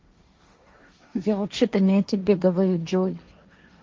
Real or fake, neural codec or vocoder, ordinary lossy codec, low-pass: fake; codec, 16 kHz, 1.1 kbps, Voila-Tokenizer; Opus, 32 kbps; 7.2 kHz